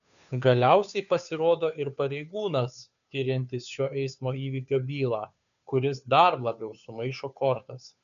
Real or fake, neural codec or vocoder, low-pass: fake; codec, 16 kHz, 2 kbps, FunCodec, trained on Chinese and English, 25 frames a second; 7.2 kHz